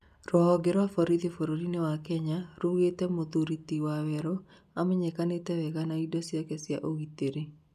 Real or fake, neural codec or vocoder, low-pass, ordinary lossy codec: real; none; 14.4 kHz; none